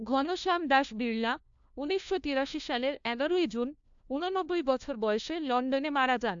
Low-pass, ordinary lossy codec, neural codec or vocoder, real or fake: 7.2 kHz; AAC, 64 kbps; codec, 16 kHz, 1 kbps, FunCodec, trained on LibriTTS, 50 frames a second; fake